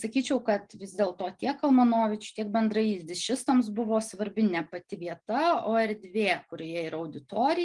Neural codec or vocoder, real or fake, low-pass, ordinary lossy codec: none; real; 10.8 kHz; Opus, 24 kbps